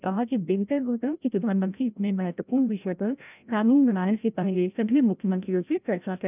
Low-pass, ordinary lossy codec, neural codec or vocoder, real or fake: 3.6 kHz; none; codec, 16 kHz, 0.5 kbps, FreqCodec, larger model; fake